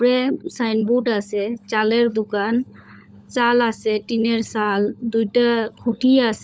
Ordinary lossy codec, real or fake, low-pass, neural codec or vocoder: none; fake; none; codec, 16 kHz, 16 kbps, FunCodec, trained on LibriTTS, 50 frames a second